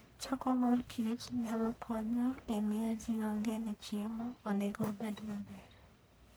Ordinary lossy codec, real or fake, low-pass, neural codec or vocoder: none; fake; none; codec, 44.1 kHz, 1.7 kbps, Pupu-Codec